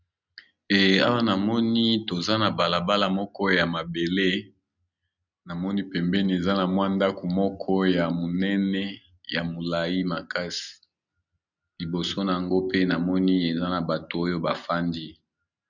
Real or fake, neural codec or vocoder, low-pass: real; none; 7.2 kHz